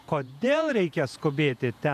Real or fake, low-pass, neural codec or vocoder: fake; 14.4 kHz; vocoder, 48 kHz, 128 mel bands, Vocos